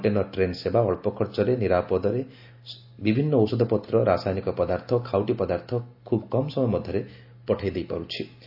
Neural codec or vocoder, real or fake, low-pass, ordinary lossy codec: none; real; 5.4 kHz; AAC, 48 kbps